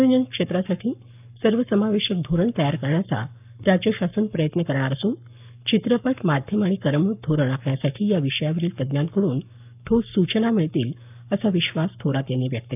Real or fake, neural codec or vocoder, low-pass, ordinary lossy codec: fake; codec, 44.1 kHz, 7.8 kbps, Pupu-Codec; 3.6 kHz; none